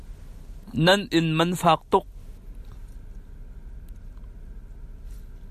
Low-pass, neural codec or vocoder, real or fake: 14.4 kHz; none; real